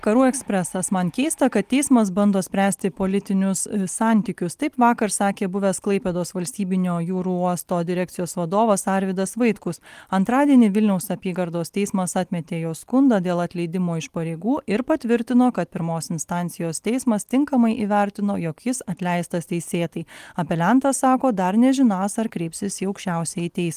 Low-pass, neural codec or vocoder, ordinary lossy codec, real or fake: 14.4 kHz; none; Opus, 24 kbps; real